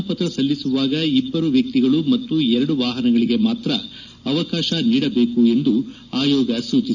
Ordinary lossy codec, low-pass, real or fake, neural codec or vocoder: none; 7.2 kHz; real; none